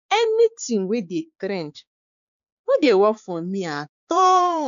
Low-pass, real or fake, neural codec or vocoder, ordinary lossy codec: 7.2 kHz; fake; codec, 16 kHz, 4 kbps, X-Codec, HuBERT features, trained on balanced general audio; none